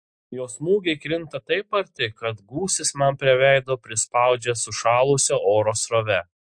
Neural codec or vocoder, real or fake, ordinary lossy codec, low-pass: none; real; MP3, 48 kbps; 9.9 kHz